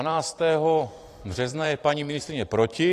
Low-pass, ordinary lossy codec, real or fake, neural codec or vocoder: 14.4 kHz; AAC, 48 kbps; fake; vocoder, 44.1 kHz, 128 mel bands every 512 samples, BigVGAN v2